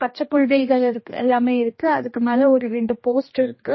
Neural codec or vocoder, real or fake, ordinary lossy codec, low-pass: codec, 16 kHz, 1 kbps, X-Codec, HuBERT features, trained on general audio; fake; MP3, 24 kbps; 7.2 kHz